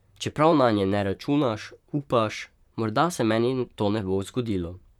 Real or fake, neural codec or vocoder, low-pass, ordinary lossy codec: fake; vocoder, 44.1 kHz, 128 mel bands, Pupu-Vocoder; 19.8 kHz; none